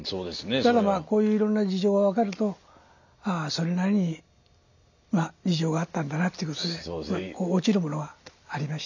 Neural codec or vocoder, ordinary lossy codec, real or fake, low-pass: none; none; real; 7.2 kHz